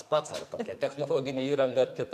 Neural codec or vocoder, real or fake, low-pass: codec, 44.1 kHz, 2.6 kbps, SNAC; fake; 14.4 kHz